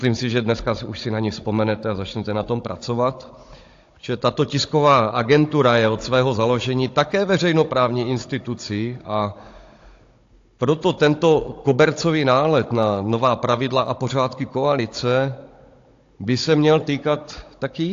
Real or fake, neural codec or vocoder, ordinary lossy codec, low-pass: fake; codec, 16 kHz, 16 kbps, FunCodec, trained on Chinese and English, 50 frames a second; AAC, 48 kbps; 7.2 kHz